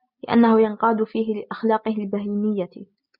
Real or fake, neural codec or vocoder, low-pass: real; none; 5.4 kHz